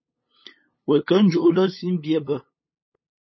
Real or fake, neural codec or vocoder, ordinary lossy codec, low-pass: fake; codec, 16 kHz, 8 kbps, FunCodec, trained on LibriTTS, 25 frames a second; MP3, 24 kbps; 7.2 kHz